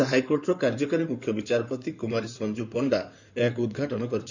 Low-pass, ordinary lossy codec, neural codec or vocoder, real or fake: 7.2 kHz; none; codec, 16 kHz in and 24 kHz out, 2.2 kbps, FireRedTTS-2 codec; fake